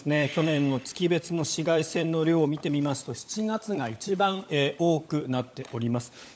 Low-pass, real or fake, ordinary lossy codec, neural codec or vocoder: none; fake; none; codec, 16 kHz, 16 kbps, FunCodec, trained on LibriTTS, 50 frames a second